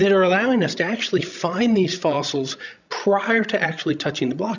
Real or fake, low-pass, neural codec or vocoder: fake; 7.2 kHz; codec, 16 kHz, 16 kbps, FreqCodec, larger model